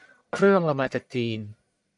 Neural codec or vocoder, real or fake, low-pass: codec, 44.1 kHz, 1.7 kbps, Pupu-Codec; fake; 10.8 kHz